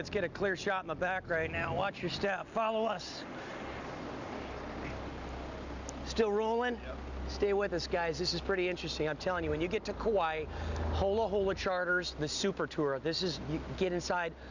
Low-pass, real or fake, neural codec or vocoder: 7.2 kHz; real; none